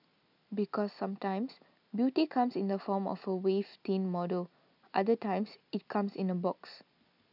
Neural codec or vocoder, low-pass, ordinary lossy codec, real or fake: none; 5.4 kHz; none; real